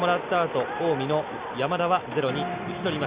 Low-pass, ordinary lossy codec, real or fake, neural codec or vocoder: 3.6 kHz; Opus, 64 kbps; real; none